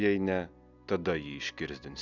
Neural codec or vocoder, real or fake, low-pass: none; real; 7.2 kHz